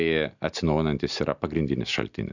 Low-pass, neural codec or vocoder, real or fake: 7.2 kHz; none; real